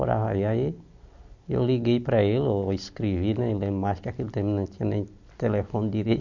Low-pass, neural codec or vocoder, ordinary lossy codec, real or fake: 7.2 kHz; none; none; real